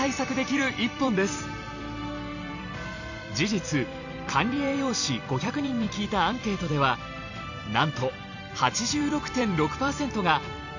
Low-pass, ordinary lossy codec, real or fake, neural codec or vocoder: 7.2 kHz; none; fake; vocoder, 44.1 kHz, 128 mel bands every 256 samples, BigVGAN v2